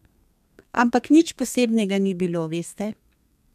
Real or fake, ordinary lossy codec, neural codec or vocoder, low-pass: fake; none; codec, 32 kHz, 1.9 kbps, SNAC; 14.4 kHz